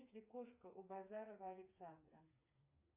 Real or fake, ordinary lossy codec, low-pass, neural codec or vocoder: fake; AAC, 32 kbps; 3.6 kHz; codec, 16 kHz, 4 kbps, FreqCodec, smaller model